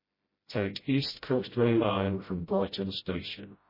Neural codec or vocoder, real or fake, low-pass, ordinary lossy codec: codec, 16 kHz, 0.5 kbps, FreqCodec, smaller model; fake; 5.4 kHz; MP3, 24 kbps